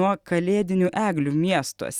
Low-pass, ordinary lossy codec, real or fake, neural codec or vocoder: 19.8 kHz; Opus, 64 kbps; real; none